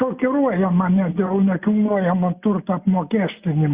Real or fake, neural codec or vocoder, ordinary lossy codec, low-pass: real; none; Opus, 64 kbps; 3.6 kHz